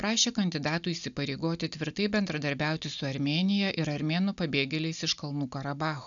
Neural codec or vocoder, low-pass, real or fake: none; 7.2 kHz; real